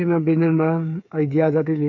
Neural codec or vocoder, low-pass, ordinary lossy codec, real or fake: codec, 16 kHz, 8 kbps, FreqCodec, smaller model; 7.2 kHz; none; fake